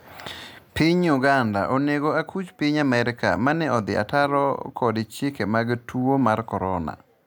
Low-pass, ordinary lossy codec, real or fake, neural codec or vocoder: none; none; real; none